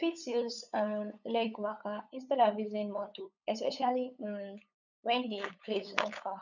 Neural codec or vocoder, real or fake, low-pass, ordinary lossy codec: codec, 16 kHz, 8 kbps, FunCodec, trained on LibriTTS, 25 frames a second; fake; 7.2 kHz; none